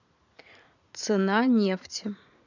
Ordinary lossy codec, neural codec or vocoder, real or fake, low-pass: none; vocoder, 44.1 kHz, 80 mel bands, Vocos; fake; 7.2 kHz